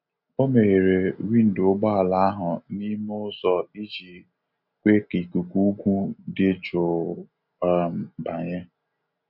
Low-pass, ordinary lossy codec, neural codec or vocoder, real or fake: 5.4 kHz; none; none; real